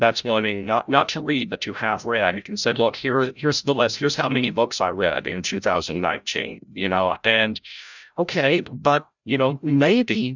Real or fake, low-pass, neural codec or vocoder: fake; 7.2 kHz; codec, 16 kHz, 0.5 kbps, FreqCodec, larger model